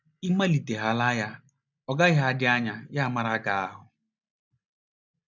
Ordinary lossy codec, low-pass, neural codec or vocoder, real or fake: none; 7.2 kHz; none; real